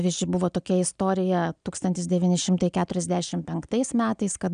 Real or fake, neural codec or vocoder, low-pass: real; none; 9.9 kHz